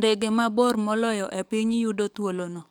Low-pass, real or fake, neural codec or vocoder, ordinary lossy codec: none; fake; codec, 44.1 kHz, 7.8 kbps, DAC; none